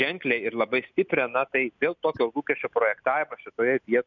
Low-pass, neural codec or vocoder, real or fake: 7.2 kHz; none; real